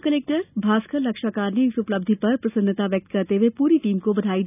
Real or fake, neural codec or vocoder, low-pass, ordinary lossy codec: real; none; 3.6 kHz; none